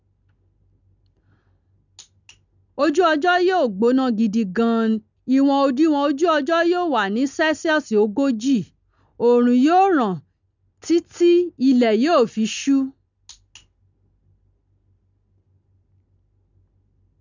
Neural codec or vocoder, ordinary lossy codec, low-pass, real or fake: none; none; 7.2 kHz; real